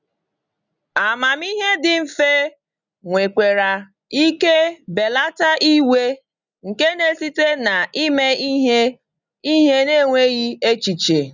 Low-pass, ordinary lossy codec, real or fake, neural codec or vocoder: 7.2 kHz; none; real; none